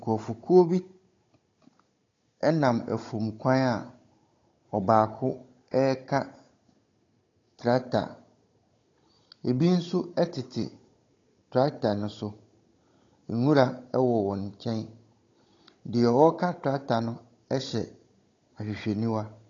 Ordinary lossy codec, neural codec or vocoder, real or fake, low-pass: AAC, 32 kbps; codec, 16 kHz, 16 kbps, FunCodec, trained on Chinese and English, 50 frames a second; fake; 7.2 kHz